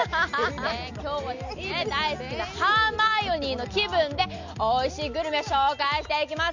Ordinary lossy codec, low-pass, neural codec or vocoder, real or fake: none; 7.2 kHz; none; real